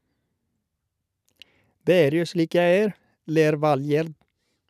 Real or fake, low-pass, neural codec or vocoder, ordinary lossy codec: real; 14.4 kHz; none; none